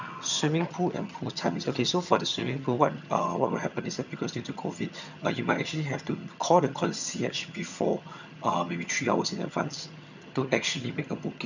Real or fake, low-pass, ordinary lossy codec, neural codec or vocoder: fake; 7.2 kHz; none; vocoder, 22.05 kHz, 80 mel bands, HiFi-GAN